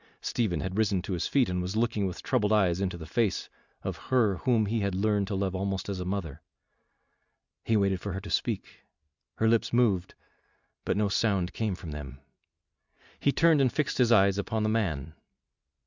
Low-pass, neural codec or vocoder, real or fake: 7.2 kHz; none; real